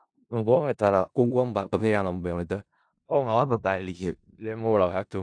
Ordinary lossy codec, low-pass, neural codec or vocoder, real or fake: AAC, 64 kbps; 9.9 kHz; codec, 16 kHz in and 24 kHz out, 0.4 kbps, LongCat-Audio-Codec, four codebook decoder; fake